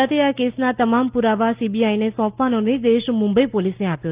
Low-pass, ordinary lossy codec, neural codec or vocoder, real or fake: 3.6 kHz; Opus, 32 kbps; none; real